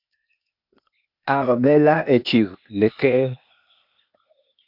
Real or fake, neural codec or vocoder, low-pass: fake; codec, 16 kHz, 0.8 kbps, ZipCodec; 5.4 kHz